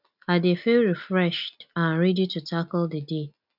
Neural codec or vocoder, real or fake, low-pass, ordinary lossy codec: none; real; 5.4 kHz; none